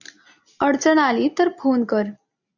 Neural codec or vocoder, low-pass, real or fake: none; 7.2 kHz; real